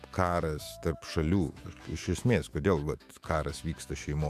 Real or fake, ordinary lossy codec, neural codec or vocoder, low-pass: fake; MP3, 96 kbps; autoencoder, 48 kHz, 128 numbers a frame, DAC-VAE, trained on Japanese speech; 14.4 kHz